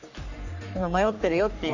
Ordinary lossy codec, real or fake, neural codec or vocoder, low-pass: none; fake; codec, 44.1 kHz, 3.4 kbps, Pupu-Codec; 7.2 kHz